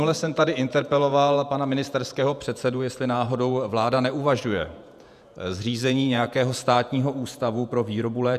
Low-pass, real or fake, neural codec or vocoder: 14.4 kHz; fake; vocoder, 48 kHz, 128 mel bands, Vocos